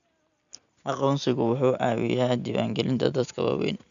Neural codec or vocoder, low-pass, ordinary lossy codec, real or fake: none; 7.2 kHz; none; real